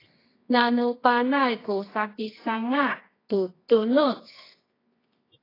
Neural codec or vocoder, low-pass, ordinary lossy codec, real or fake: codec, 24 kHz, 0.9 kbps, WavTokenizer, medium music audio release; 5.4 kHz; AAC, 24 kbps; fake